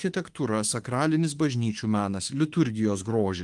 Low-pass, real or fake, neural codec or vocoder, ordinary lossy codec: 10.8 kHz; fake; autoencoder, 48 kHz, 32 numbers a frame, DAC-VAE, trained on Japanese speech; Opus, 24 kbps